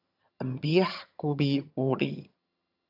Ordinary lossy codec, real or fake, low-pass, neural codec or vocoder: AAC, 48 kbps; fake; 5.4 kHz; vocoder, 22.05 kHz, 80 mel bands, HiFi-GAN